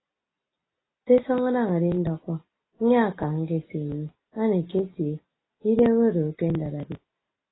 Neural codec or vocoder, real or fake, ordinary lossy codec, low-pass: none; real; AAC, 16 kbps; 7.2 kHz